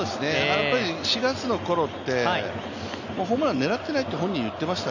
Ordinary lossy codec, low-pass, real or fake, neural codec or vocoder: none; 7.2 kHz; real; none